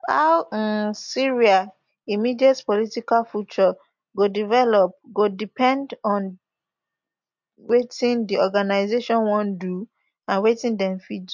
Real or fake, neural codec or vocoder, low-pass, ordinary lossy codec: real; none; 7.2 kHz; MP3, 48 kbps